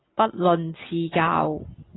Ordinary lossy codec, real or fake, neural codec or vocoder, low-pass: AAC, 16 kbps; real; none; 7.2 kHz